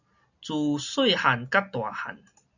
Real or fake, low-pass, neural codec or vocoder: real; 7.2 kHz; none